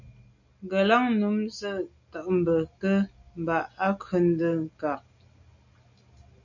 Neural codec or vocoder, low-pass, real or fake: none; 7.2 kHz; real